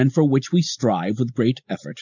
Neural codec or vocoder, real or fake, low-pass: none; real; 7.2 kHz